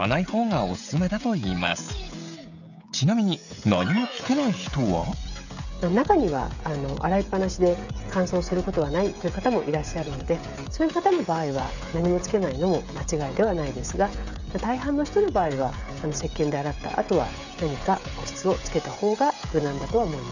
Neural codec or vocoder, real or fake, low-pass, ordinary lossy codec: codec, 16 kHz, 16 kbps, FreqCodec, smaller model; fake; 7.2 kHz; none